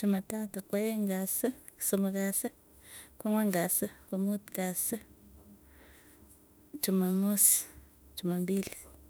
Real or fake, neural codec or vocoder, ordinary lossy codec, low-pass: fake; autoencoder, 48 kHz, 32 numbers a frame, DAC-VAE, trained on Japanese speech; none; none